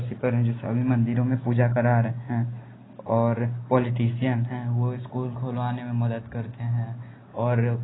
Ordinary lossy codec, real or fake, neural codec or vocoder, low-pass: AAC, 16 kbps; real; none; 7.2 kHz